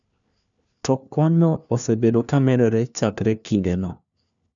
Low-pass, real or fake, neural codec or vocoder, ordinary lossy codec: 7.2 kHz; fake; codec, 16 kHz, 1 kbps, FunCodec, trained on LibriTTS, 50 frames a second; none